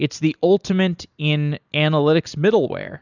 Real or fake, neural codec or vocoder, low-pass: real; none; 7.2 kHz